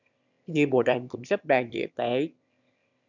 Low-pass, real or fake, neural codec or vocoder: 7.2 kHz; fake; autoencoder, 22.05 kHz, a latent of 192 numbers a frame, VITS, trained on one speaker